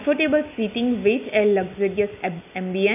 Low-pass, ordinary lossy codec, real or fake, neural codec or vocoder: 3.6 kHz; none; real; none